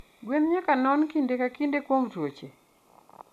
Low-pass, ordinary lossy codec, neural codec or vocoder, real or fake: 14.4 kHz; none; none; real